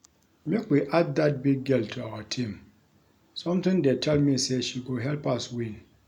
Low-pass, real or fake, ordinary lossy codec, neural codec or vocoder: 19.8 kHz; real; none; none